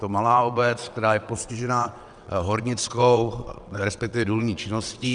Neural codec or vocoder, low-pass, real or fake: vocoder, 22.05 kHz, 80 mel bands, WaveNeXt; 9.9 kHz; fake